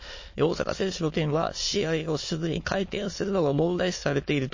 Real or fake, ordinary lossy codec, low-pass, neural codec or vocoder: fake; MP3, 32 kbps; 7.2 kHz; autoencoder, 22.05 kHz, a latent of 192 numbers a frame, VITS, trained on many speakers